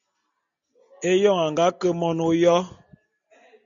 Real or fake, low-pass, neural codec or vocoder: real; 7.2 kHz; none